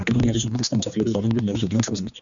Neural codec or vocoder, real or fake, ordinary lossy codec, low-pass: codec, 16 kHz, 2 kbps, X-Codec, HuBERT features, trained on general audio; fake; MP3, 64 kbps; 7.2 kHz